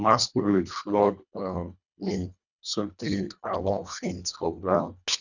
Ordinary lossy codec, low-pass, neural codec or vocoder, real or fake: none; 7.2 kHz; codec, 24 kHz, 1.5 kbps, HILCodec; fake